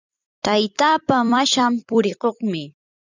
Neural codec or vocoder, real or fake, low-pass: vocoder, 44.1 kHz, 128 mel bands every 256 samples, BigVGAN v2; fake; 7.2 kHz